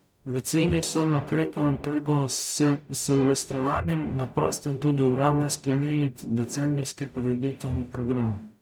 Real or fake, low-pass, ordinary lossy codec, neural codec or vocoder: fake; none; none; codec, 44.1 kHz, 0.9 kbps, DAC